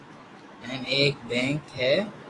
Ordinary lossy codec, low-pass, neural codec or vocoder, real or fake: AAC, 32 kbps; 10.8 kHz; autoencoder, 48 kHz, 128 numbers a frame, DAC-VAE, trained on Japanese speech; fake